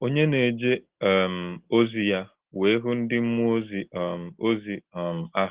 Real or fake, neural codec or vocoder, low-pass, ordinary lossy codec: real; none; 3.6 kHz; Opus, 16 kbps